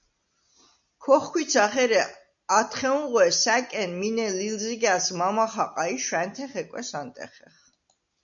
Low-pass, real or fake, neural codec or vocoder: 7.2 kHz; real; none